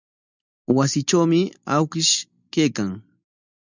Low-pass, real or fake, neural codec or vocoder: 7.2 kHz; real; none